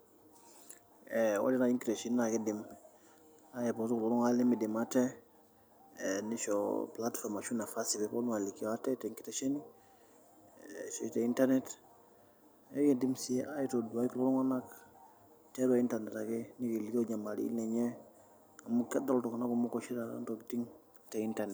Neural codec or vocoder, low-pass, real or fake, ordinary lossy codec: none; none; real; none